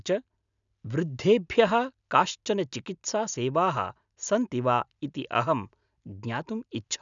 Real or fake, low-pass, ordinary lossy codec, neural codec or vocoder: real; 7.2 kHz; none; none